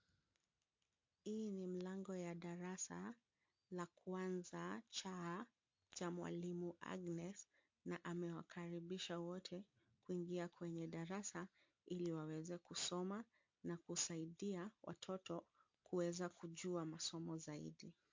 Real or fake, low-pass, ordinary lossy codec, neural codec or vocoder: real; 7.2 kHz; MP3, 48 kbps; none